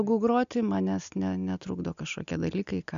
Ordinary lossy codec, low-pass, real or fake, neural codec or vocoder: AAC, 96 kbps; 7.2 kHz; real; none